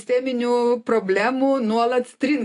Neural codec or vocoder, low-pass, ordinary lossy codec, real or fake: none; 10.8 kHz; AAC, 48 kbps; real